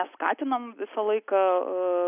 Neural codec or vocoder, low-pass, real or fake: none; 3.6 kHz; real